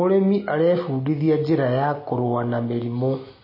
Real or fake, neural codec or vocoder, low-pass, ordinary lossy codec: real; none; 5.4 kHz; MP3, 24 kbps